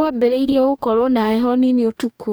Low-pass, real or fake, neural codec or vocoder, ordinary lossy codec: none; fake; codec, 44.1 kHz, 2.6 kbps, DAC; none